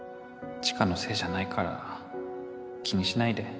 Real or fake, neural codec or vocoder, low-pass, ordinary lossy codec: real; none; none; none